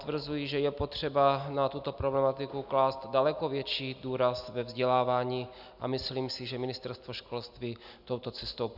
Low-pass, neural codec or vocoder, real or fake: 5.4 kHz; none; real